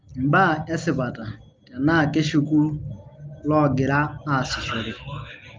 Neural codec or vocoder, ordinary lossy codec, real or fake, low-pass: none; Opus, 24 kbps; real; 7.2 kHz